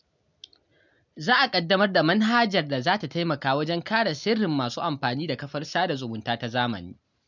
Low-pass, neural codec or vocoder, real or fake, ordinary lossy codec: 7.2 kHz; none; real; none